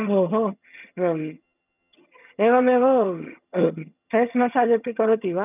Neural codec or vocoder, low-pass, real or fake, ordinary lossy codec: vocoder, 22.05 kHz, 80 mel bands, HiFi-GAN; 3.6 kHz; fake; none